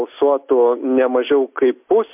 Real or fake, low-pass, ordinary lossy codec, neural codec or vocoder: real; 3.6 kHz; MP3, 32 kbps; none